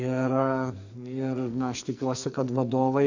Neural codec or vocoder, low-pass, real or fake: codec, 44.1 kHz, 2.6 kbps, SNAC; 7.2 kHz; fake